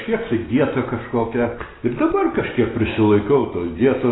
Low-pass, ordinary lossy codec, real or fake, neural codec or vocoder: 7.2 kHz; AAC, 16 kbps; real; none